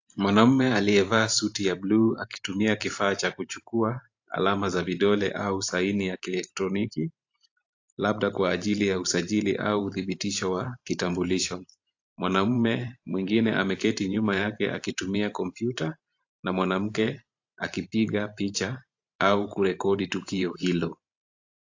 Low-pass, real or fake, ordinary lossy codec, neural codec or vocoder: 7.2 kHz; fake; AAC, 48 kbps; vocoder, 44.1 kHz, 128 mel bands every 512 samples, BigVGAN v2